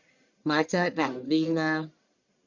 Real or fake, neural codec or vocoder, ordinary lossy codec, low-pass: fake; codec, 44.1 kHz, 1.7 kbps, Pupu-Codec; Opus, 64 kbps; 7.2 kHz